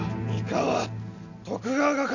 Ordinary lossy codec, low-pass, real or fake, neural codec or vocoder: none; 7.2 kHz; real; none